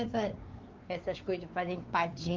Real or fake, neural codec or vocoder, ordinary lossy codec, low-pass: fake; codec, 16 kHz, 4 kbps, X-Codec, WavLM features, trained on Multilingual LibriSpeech; Opus, 32 kbps; 7.2 kHz